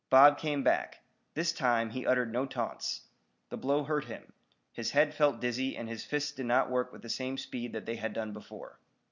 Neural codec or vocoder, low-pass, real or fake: none; 7.2 kHz; real